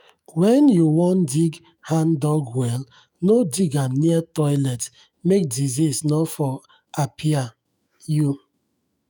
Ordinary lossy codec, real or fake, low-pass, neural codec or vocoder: none; fake; none; autoencoder, 48 kHz, 128 numbers a frame, DAC-VAE, trained on Japanese speech